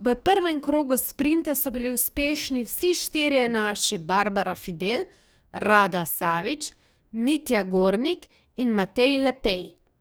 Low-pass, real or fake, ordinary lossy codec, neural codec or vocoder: none; fake; none; codec, 44.1 kHz, 2.6 kbps, DAC